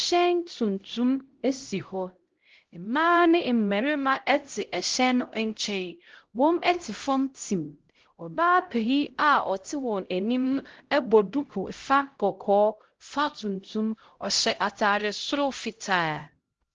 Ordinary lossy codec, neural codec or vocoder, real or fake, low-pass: Opus, 16 kbps; codec, 16 kHz, 0.5 kbps, X-Codec, HuBERT features, trained on LibriSpeech; fake; 7.2 kHz